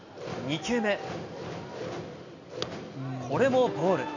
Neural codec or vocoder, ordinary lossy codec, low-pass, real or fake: none; none; 7.2 kHz; real